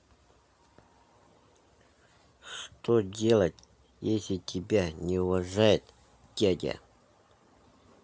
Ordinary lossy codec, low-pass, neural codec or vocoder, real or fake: none; none; none; real